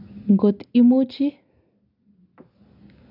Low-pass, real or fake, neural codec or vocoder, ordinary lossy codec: 5.4 kHz; real; none; none